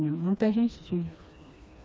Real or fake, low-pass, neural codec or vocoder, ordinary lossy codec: fake; none; codec, 16 kHz, 2 kbps, FreqCodec, smaller model; none